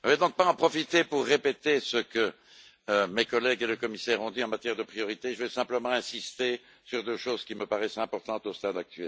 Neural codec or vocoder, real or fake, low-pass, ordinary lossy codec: none; real; none; none